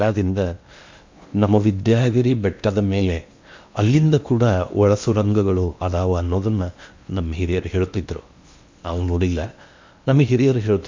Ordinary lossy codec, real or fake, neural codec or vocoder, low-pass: MP3, 64 kbps; fake; codec, 16 kHz in and 24 kHz out, 0.8 kbps, FocalCodec, streaming, 65536 codes; 7.2 kHz